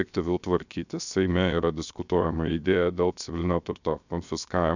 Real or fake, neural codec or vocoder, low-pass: fake; codec, 16 kHz, 0.7 kbps, FocalCodec; 7.2 kHz